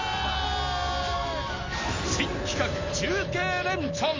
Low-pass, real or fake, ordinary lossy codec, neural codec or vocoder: 7.2 kHz; real; MP3, 64 kbps; none